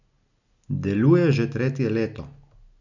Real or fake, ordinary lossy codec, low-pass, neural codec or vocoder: real; none; 7.2 kHz; none